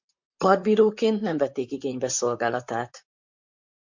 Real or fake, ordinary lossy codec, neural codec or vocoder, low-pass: fake; MP3, 64 kbps; vocoder, 44.1 kHz, 128 mel bands, Pupu-Vocoder; 7.2 kHz